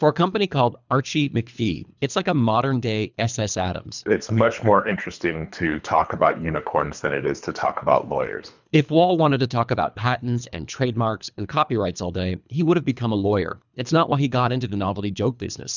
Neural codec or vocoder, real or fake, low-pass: codec, 24 kHz, 3 kbps, HILCodec; fake; 7.2 kHz